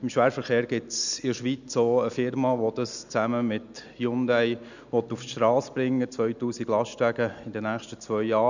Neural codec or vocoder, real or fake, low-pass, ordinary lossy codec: none; real; 7.2 kHz; none